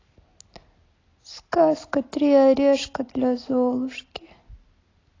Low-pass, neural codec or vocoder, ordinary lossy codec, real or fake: 7.2 kHz; none; AAC, 32 kbps; real